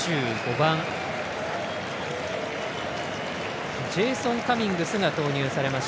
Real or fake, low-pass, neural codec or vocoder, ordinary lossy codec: real; none; none; none